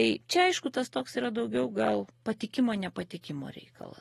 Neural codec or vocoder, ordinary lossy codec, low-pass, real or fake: none; AAC, 32 kbps; 19.8 kHz; real